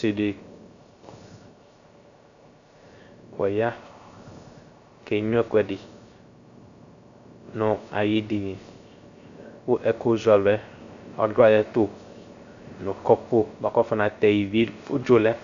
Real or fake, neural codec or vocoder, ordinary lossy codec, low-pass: fake; codec, 16 kHz, 0.3 kbps, FocalCodec; Opus, 64 kbps; 7.2 kHz